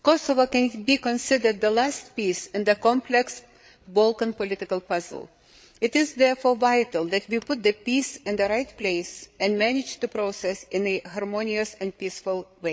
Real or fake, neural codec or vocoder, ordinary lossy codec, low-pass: fake; codec, 16 kHz, 8 kbps, FreqCodec, larger model; none; none